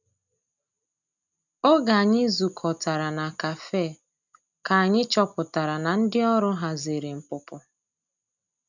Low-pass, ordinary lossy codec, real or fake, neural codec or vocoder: 7.2 kHz; none; real; none